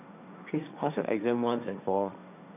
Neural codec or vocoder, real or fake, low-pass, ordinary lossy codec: codec, 16 kHz, 1.1 kbps, Voila-Tokenizer; fake; 3.6 kHz; none